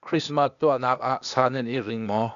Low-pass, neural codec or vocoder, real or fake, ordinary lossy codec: 7.2 kHz; codec, 16 kHz, 0.8 kbps, ZipCodec; fake; AAC, 96 kbps